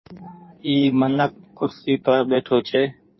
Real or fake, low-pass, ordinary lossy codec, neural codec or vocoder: fake; 7.2 kHz; MP3, 24 kbps; codec, 16 kHz in and 24 kHz out, 1.1 kbps, FireRedTTS-2 codec